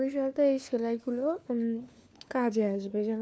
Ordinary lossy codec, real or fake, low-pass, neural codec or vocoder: none; fake; none; codec, 16 kHz, 4 kbps, FunCodec, trained on LibriTTS, 50 frames a second